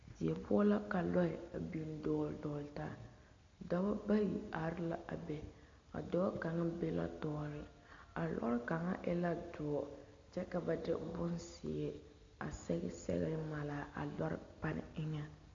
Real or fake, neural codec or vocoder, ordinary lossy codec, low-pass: real; none; MP3, 48 kbps; 7.2 kHz